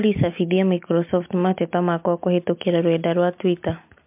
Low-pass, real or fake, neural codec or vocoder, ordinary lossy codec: 3.6 kHz; real; none; MP3, 32 kbps